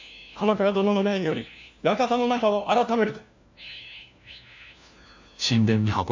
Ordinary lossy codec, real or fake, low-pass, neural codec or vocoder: MP3, 64 kbps; fake; 7.2 kHz; codec, 16 kHz, 1 kbps, FunCodec, trained on LibriTTS, 50 frames a second